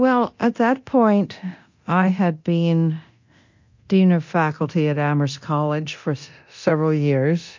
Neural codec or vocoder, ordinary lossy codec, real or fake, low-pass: codec, 24 kHz, 0.9 kbps, DualCodec; MP3, 48 kbps; fake; 7.2 kHz